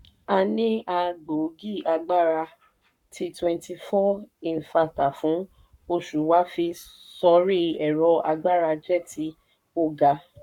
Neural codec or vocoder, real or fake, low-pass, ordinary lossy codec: codec, 44.1 kHz, 7.8 kbps, Pupu-Codec; fake; 19.8 kHz; none